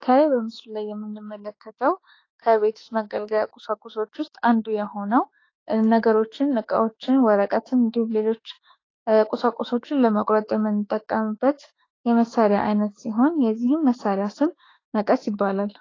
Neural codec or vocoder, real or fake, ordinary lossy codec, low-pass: autoencoder, 48 kHz, 32 numbers a frame, DAC-VAE, trained on Japanese speech; fake; AAC, 32 kbps; 7.2 kHz